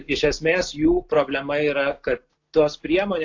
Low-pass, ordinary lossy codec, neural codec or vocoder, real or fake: 7.2 kHz; AAC, 48 kbps; none; real